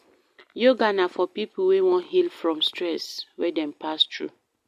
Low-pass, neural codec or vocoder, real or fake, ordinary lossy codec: 14.4 kHz; none; real; MP3, 64 kbps